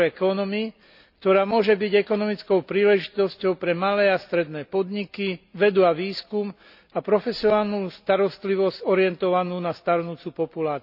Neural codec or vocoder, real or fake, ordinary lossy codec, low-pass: none; real; none; 5.4 kHz